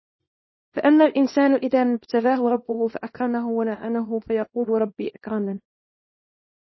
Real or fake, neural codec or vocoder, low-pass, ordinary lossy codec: fake; codec, 24 kHz, 0.9 kbps, WavTokenizer, small release; 7.2 kHz; MP3, 24 kbps